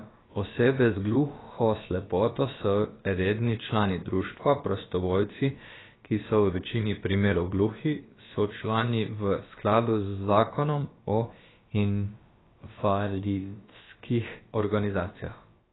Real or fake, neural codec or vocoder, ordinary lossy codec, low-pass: fake; codec, 16 kHz, about 1 kbps, DyCAST, with the encoder's durations; AAC, 16 kbps; 7.2 kHz